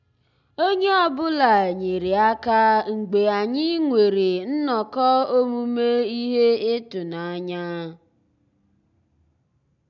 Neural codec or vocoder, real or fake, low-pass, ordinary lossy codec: none; real; 7.2 kHz; none